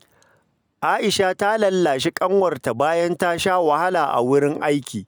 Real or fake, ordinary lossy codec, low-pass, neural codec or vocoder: real; none; none; none